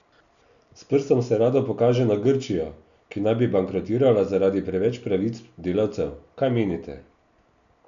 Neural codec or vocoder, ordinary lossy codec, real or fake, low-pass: none; none; real; 7.2 kHz